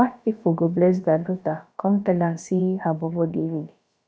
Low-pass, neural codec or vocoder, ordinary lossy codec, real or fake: none; codec, 16 kHz, about 1 kbps, DyCAST, with the encoder's durations; none; fake